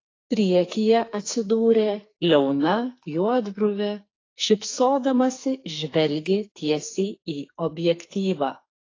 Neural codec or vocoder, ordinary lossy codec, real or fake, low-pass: codec, 44.1 kHz, 2.6 kbps, SNAC; AAC, 32 kbps; fake; 7.2 kHz